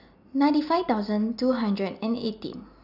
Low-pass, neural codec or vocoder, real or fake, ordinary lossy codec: 5.4 kHz; none; real; none